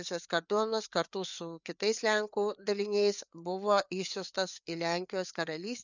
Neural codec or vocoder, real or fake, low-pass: codec, 16 kHz, 4 kbps, FreqCodec, larger model; fake; 7.2 kHz